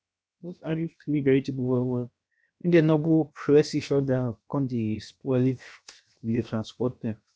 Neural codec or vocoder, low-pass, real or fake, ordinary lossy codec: codec, 16 kHz, 0.7 kbps, FocalCodec; none; fake; none